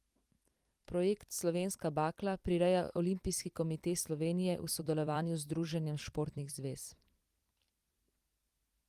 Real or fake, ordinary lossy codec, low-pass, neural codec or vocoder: fake; Opus, 24 kbps; 14.4 kHz; vocoder, 44.1 kHz, 128 mel bands every 256 samples, BigVGAN v2